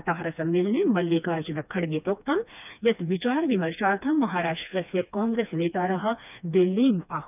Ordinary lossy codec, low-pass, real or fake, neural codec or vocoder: none; 3.6 kHz; fake; codec, 16 kHz, 2 kbps, FreqCodec, smaller model